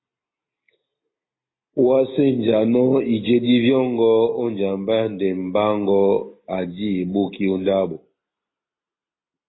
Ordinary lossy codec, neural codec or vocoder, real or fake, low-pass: AAC, 16 kbps; none; real; 7.2 kHz